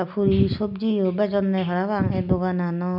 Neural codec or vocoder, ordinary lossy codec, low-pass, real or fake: none; none; 5.4 kHz; real